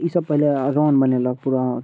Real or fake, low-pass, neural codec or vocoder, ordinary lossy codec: real; none; none; none